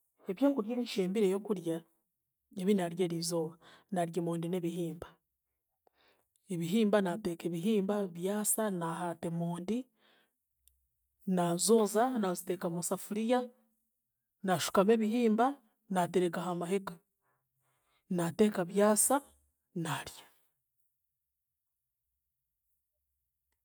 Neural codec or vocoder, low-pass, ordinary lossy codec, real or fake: none; none; none; real